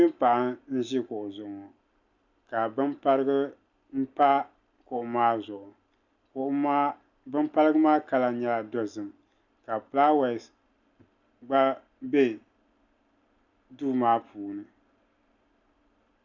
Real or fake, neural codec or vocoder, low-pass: real; none; 7.2 kHz